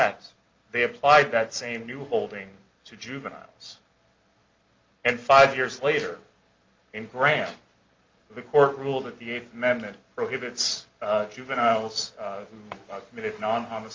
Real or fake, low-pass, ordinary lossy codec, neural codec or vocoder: real; 7.2 kHz; Opus, 32 kbps; none